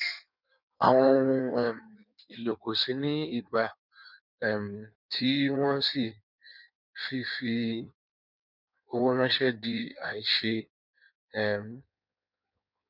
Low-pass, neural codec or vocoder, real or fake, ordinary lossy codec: 5.4 kHz; codec, 16 kHz in and 24 kHz out, 1.1 kbps, FireRedTTS-2 codec; fake; none